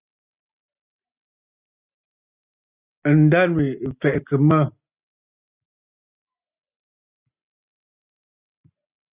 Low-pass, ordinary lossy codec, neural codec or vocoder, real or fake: 3.6 kHz; Opus, 64 kbps; none; real